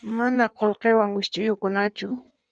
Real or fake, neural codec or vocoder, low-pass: fake; codec, 16 kHz in and 24 kHz out, 1.1 kbps, FireRedTTS-2 codec; 9.9 kHz